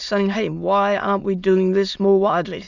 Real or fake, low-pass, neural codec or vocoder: fake; 7.2 kHz; autoencoder, 22.05 kHz, a latent of 192 numbers a frame, VITS, trained on many speakers